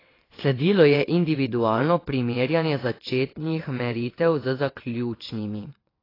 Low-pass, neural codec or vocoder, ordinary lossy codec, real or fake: 5.4 kHz; vocoder, 22.05 kHz, 80 mel bands, WaveNeXt; AAC, 24 kbps; fake